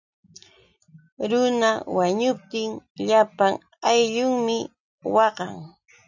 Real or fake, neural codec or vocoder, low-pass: real; none; 7.2 kHz